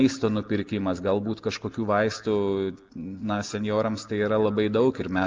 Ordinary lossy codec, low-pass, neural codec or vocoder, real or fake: Opus, 24 kbps; 7.2 kHz; none; real